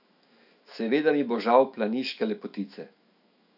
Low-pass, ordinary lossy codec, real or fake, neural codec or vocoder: 5.4 kHz; none; fake; autoencoder, 48 kHz, 128 numbers a frame, DAC-VAE, trained on Japanese speech